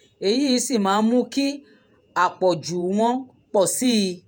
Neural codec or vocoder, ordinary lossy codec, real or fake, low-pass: vocoder, 48 kHz, 128 mel bands, Vocos; none; fake; none